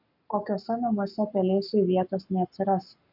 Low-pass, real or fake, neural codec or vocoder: 5.4 kHz; fake; codec, 44.1 kHz, 7.8 kbps, Pupu-Codec